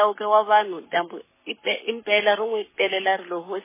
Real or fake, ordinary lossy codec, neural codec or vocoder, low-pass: fake; MP3, 16 kbps; codec, 44.1 kHz, 7.8 kbps, Pupu-Codec; 3.6 kHz